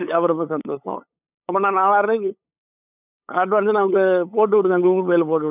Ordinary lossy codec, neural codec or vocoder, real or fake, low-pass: none; codec, 16 kHz, 8 kbps, FunCodec, trained on LibriTTS, 25 frames a second; fake; 3.6 kHz